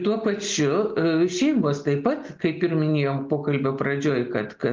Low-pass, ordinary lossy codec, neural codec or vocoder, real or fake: 7.2 kHz; Opus, 32 kbps; none; real